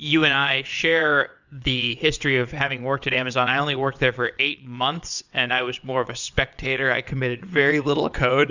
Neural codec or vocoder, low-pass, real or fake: vocoder, 22.05 kHz, 80 mel bands, WaveNeXt; 7.2 kHz; fake